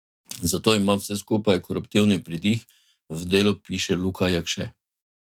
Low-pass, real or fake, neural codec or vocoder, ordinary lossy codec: 19.8 kHz; fake; codec, 44.1 kHz, 7.8 kbps, DAC; none